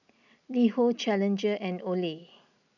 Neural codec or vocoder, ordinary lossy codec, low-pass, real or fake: none; none; 7.2 kHz; real